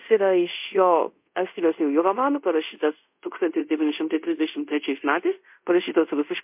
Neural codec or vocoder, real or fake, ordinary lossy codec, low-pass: codec, 24 kHz, 0.5 kbps, DualCodec; fake; MP3, 32 kbps; 3.6 kHz